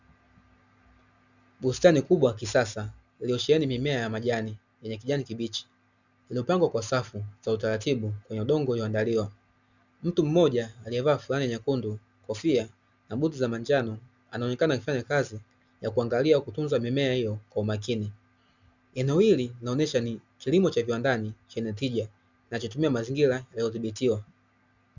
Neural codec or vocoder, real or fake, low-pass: none; real; 7.2 kHz